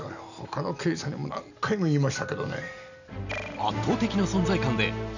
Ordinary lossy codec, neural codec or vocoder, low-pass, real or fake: none; none; 7.2 kHz; real